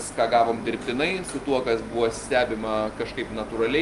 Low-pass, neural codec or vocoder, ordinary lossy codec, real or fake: 10.8 kHz; none; Opus, 32 kbps; real